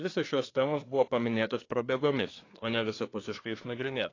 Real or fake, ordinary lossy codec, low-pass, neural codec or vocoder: fake; AAC, 32 kbps; 7.2 kHz; codec, 24 kHz, 1 kbps, SNAC